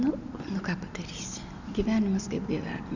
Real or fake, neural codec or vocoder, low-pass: real; none; 7.2 kHz